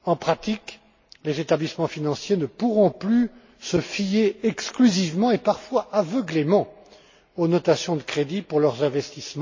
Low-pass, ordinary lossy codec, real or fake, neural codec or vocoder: 7.2 kHz; MP3, 32 kbps; real; none